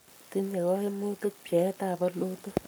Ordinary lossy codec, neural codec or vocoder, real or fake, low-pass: none; codec, 44.1 kHz, 7.8 kbps, Pupu-Codec; fake; none